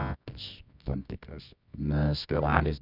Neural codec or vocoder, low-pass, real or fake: codec, 24 kHz, 0.9 kbps, WavTokenizer, medium music audio release; 5.4 kHz; fake